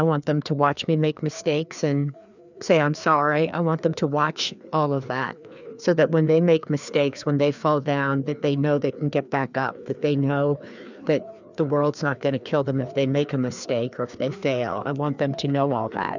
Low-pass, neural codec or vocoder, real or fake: 7.2 kHz; codec, 16 kHz, 2 kbps, FreqCodec, larger model; fake